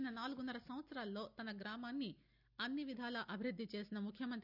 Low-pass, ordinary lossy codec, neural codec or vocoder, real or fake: 5.4 kHz; none; none; real